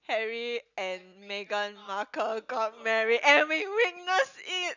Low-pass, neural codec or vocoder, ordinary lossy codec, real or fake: 7.2 kHz; none; none; real